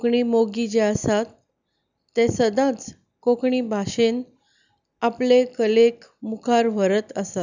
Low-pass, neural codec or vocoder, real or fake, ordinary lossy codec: 7.2 kHz; none; real; none